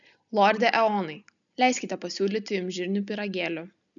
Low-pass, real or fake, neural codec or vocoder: 7.2 kHz; real; none